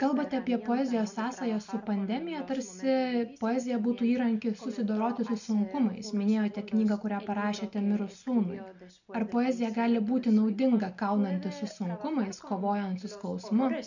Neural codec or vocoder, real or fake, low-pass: none; real; 7.2 kHz